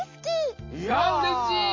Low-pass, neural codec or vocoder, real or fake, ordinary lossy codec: 7.2 kHz; none; real; MP3, 32 kbps